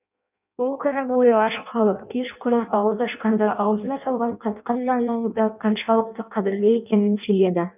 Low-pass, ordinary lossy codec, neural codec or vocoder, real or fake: 3.6 kHz; none; codec, 16 kHz in and 24 kHz out, 0.6 kbps, FireRedTTS-2 codec; fake